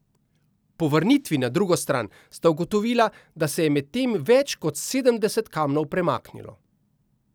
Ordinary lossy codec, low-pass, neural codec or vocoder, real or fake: none; none; none; real